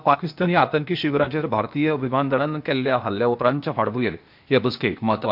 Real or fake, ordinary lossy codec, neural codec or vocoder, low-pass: fake; MP3, 48 kbps; codec, 16 kHz, 0.8 kbps, ZipCodec; 5.4 kHz